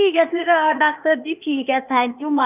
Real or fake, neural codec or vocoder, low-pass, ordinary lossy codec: fake; codec, 16 kHz, 0.8 kbps, ZipCodec; 3.6 kHz; none